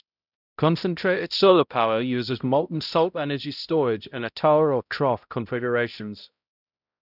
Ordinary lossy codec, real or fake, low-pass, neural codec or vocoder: none; fake; 5.4 kHz; codec, 16 kHz, 0.5 kbps, X-Codec, HuBERT features, trained on balanced general audio